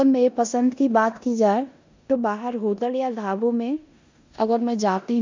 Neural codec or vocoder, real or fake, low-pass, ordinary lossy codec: codec, 16 kHz in and 24 kHz out, 0.9 kbps, LongCat-Audio-Codec, four codebook decoder; fake; 7.2 kHz; MP3, 64 kbps